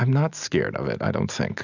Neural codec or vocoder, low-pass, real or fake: none; 7.2 kHz; real